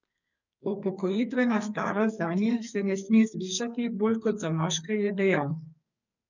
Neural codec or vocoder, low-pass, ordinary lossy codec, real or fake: codec, 44.1 kHz, 2.6 kbps, SNAC; 7.2 kHz; none; fake